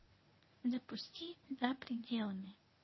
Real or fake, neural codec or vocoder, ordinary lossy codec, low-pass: fake; codec, 24 kHz, 0.9 kbps, WavTokenizer, medium speech release version 1; MP3, 24 kbps; 7.2 kHz